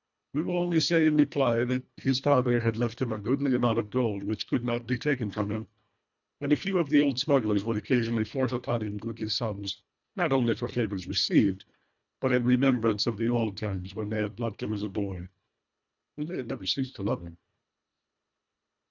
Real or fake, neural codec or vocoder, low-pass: fake; codec, 24 kHz, 1.5 kbps, HILCodec; 7.2 kHz